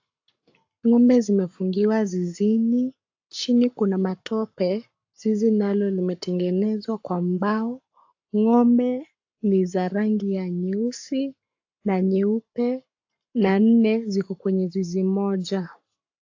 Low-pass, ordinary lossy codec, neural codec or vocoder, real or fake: 7.2 kHz; AAC, 48 kbps; codec, 44.1 kHz, 7.8 kbps, Pupu-Codec; fake